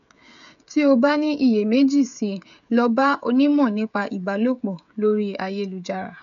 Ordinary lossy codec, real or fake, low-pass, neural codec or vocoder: none; fake; 7.2 kHz; codec, 16 kHz, 16 kbps, FreqCodec, smaller model